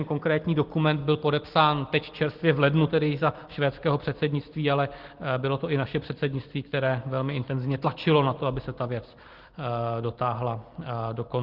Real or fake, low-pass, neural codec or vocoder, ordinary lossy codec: real; 5.4 kHz; none; Opus, 16 kbps